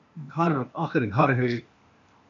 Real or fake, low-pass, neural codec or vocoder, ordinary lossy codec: fake; 7.2 kHz; codec, 16 kHz, 0.8 kbps, ZipCodec; MP3, 48 kbps